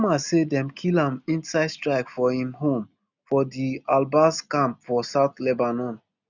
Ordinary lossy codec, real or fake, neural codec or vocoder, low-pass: none; real; none; 7.2 kHz